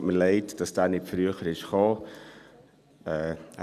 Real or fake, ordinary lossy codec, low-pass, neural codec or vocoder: real; none; 14.4 kHz; none